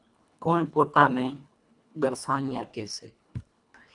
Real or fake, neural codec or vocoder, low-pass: fake; codec, 24 kHz, 1.5 kbps, HILCodec; 10.8 kHz